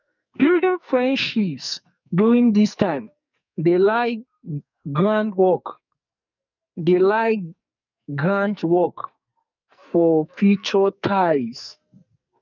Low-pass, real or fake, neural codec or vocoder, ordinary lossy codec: 7.2 kHz; fake; codec, 44.1 kHz, 2.6 kbps, SNAC; none